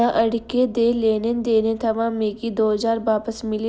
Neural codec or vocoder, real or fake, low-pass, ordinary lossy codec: none; real; none; none